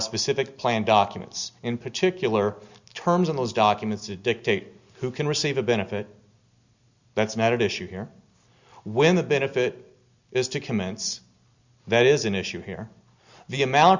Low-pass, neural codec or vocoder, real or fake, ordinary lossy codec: 7.2 kHz; none; real; Opus, 64 kbps